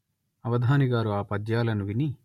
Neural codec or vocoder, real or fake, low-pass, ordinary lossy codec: none; real; 19.8 kHz; MP3, 64 kbps